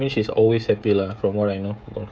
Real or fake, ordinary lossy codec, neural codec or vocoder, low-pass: fake; none; codec, 16 kHz, 16 kbps, FreqCodec, smaller model; none